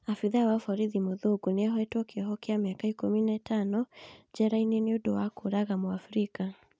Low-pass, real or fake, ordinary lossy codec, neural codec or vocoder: none; real; none; none